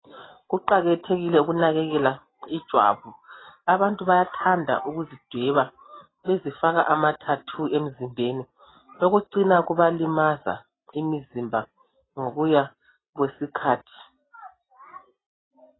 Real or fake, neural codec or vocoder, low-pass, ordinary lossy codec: real; none; 7.2 kHz; AAC, 16 kbps